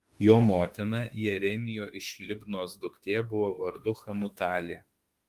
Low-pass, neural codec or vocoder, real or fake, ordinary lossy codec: 14.4 kHz; autoencoder, 48 kHz, 32 numbers a frame, DAC-VAE, trained on Japanese speech; fake; Opus, 32 kbps